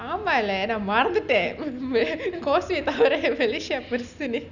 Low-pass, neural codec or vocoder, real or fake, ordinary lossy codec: 7.2 kHz; none; real; none